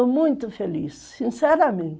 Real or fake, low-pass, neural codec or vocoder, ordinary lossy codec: real; none; none; none